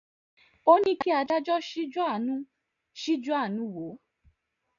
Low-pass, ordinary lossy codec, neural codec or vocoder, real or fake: 7.2 kHz; MP3, 96 kbps; none; real